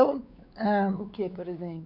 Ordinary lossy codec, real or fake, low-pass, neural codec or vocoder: none; fake; 5.4 kHz; codec, 16 kHz, 4 kbps, X-Codec, WavLM features, trained on Multilingual LibriSpeech